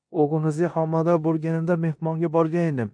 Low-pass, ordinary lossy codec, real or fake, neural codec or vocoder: 9.9 kHz; AAC, 64 kbps; fake; codec, 16 kHz in and 24 kHz out, 0.9 kbps, LongCat-Audio-Codec, four codebook decoder